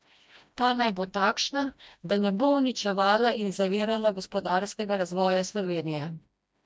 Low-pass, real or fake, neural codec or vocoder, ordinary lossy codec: none; fake; codec, 16 kHz, 1 kbps, FreqCodec, smaller model; none